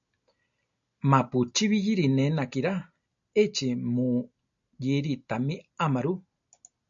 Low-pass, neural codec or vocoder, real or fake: 7.2 kHz; none; real